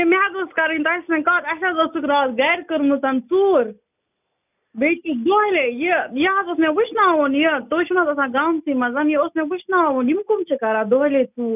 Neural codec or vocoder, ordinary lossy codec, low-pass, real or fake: none; none; 3.6 kHz; real